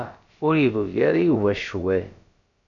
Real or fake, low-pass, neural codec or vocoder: fake; 7.2 kHz; codec, 16 kHz, about 1 kbps, DyCAST, with the encoder's durations